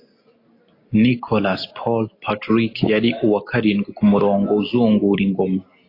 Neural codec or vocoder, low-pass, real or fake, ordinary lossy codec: none; 5.4 kHz; real; AAC, 32 kbps